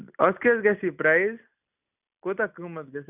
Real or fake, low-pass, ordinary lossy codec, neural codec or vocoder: real; 3.6 kHz; none; none